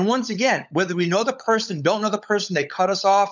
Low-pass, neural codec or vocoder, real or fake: 7.2 kHz; codec, 16 kHz, 16 kbps, FunCodec, trained on LibriTTS, 50 frames a second; fake